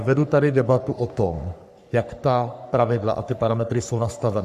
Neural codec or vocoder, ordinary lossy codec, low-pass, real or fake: codec, 44.1 kHz, 3.4 kbps, Pupu-Codec; MP3, 96 kbps; 14.4 kHz; fake